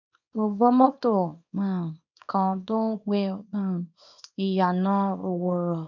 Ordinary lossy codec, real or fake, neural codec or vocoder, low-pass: none; fake; codec, 24 kHz, 0.9 kbps, WavTokenizer, small release; 7.2 kHz